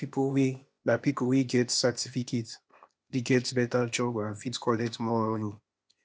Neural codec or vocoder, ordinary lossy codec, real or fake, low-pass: codec, 16 kHz, 0.8 kbps, ZipCodec; none; fake; none